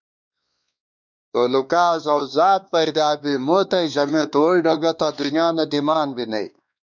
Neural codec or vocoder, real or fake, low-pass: codec, 16 kHz, 2 kbps, X-Codec, WavLM features, trained on Multilingual LibriSpeech; fake; 7.2 kHz